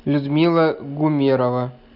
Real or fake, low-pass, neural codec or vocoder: real; 5.4 kHz; none